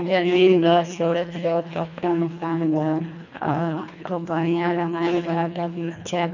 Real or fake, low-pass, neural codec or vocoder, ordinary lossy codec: fake; 7.2 kHz; codec, 24 kHz, 1.5 kbps, HILCodec; none